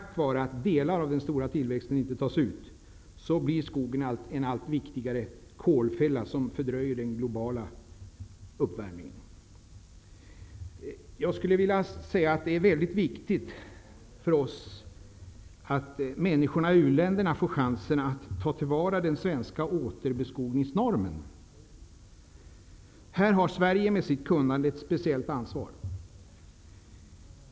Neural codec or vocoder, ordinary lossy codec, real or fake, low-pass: none; none; real; none